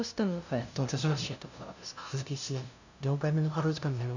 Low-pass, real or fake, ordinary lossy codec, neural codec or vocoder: 7.2 kHz; fake; none; codec, 16 kHz, 0.5 kbps, FunCodec, trained on LibriTTS, 25 frames a second